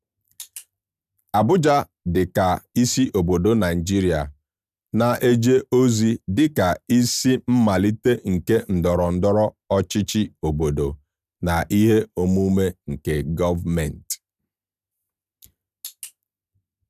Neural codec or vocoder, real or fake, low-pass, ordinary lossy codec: none; real; 14.4 kHz; none